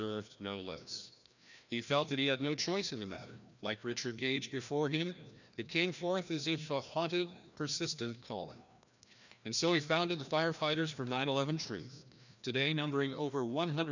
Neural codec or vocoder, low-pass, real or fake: codec, 16 kHz, 1 kbps, FreqCodec, larger model; 7.2 kHz; fake